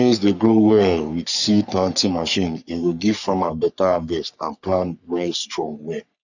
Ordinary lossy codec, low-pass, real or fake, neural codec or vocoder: none; 7.2 kHz; fake; codec, 44.1 kHz, 3.4 kbps, Pupu-Codec